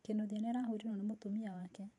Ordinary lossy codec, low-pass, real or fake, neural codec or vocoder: AAC, 48 kbps; 10.8 kHz; fake; vocoder, 44.1 kHz, 128 mel bands every 512 samples, BigVGAN v2